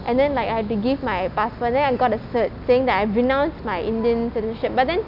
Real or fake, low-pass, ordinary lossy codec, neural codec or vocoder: real; 5.4 kHz; none; none